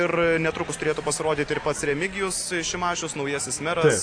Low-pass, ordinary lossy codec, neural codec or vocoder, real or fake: 9.9 kHz; AAC, 48 kbps; none; real